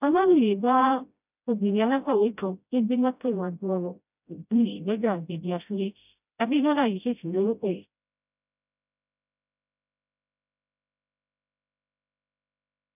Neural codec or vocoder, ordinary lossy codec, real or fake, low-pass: codec, 16 kHz, 0.5 kbps, FreqCodec, smaller model; none; fake; 3.6 kHz